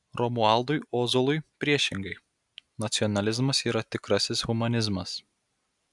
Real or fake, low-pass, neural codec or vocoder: real; 10.8 kHz; none